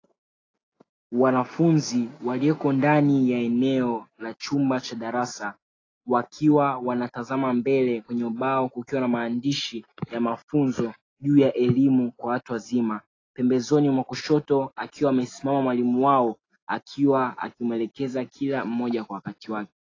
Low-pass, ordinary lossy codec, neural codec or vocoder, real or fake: 7.2 kHz; AAC, 32 kbps; none; real